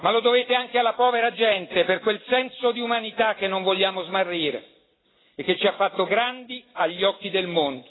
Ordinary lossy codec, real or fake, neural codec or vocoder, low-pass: AAC, 16 kbps; real; none; 7.2 kHz